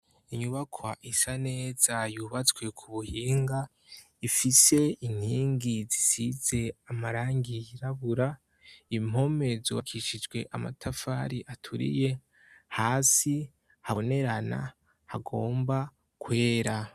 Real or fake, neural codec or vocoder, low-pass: real; none; 14.4 kHz